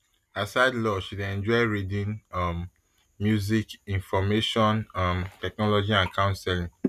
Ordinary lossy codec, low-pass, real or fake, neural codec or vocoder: none; 14.4 kHz; real; none